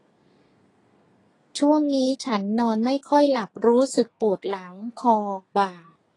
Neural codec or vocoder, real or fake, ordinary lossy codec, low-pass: codec, 32 kHz, 1.9 kbps, SNAC; fake; AAC, 32 kbps; 10.8 kHz